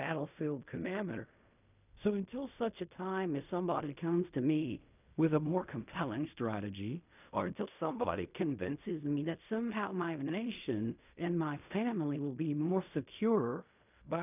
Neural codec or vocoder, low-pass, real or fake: codec, 16 kHz in and 24 kHz out, 0.4 kbps, LongCat-Audio-Codec, fine tuned four codebook decoder; 3.6 kHz; fake